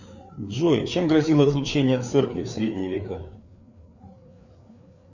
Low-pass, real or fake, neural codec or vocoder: 7.2 kHz; fake; codec, 16 kHz, 4 kbps, FreqCodec, larger model